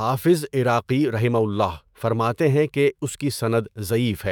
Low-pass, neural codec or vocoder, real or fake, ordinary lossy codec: 19.8 kHz; autoencoder, 48 kHz, 128 numbers a frame, DAC-VAE, trained on Japanese speech; fake; none